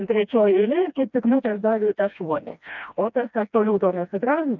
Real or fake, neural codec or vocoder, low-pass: fake; codec, 16 kHz, 1 kbps, FreqCodec, smaller model; 7.2 kHz